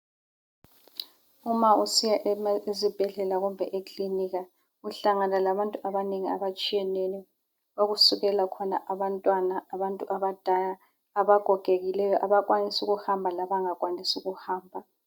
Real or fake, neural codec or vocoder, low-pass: real; none; 19.8 kHz